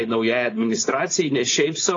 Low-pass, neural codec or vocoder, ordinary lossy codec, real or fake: 7.2 kHz; codec, 16 kHz, 4.8 kbps, FACodec; AAC, 32 kbps; fake